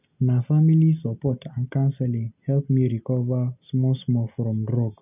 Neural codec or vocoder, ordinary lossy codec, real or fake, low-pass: none; none; real; 3.6 kHz